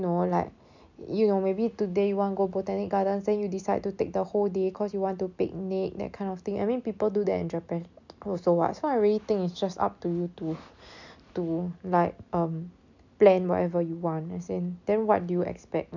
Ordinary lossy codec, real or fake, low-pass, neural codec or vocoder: none; real; 7.2 kHz; none